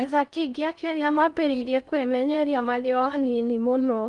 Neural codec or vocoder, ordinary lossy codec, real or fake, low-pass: codec, 16 kHz in and 24 kHz out, 0.6 kbps, FocalCodec, streaming, 4096 codes; Opus, 32 kbps; fake; 10.8 kHz